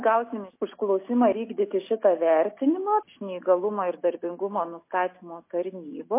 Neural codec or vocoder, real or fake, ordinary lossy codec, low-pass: none; real; MP3, 24 kbps; 3.6 kHz